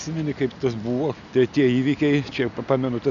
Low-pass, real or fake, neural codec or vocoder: 7.2 kHz; real; none